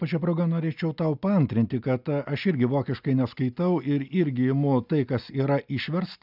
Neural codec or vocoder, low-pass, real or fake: none; 5.4 kHz; real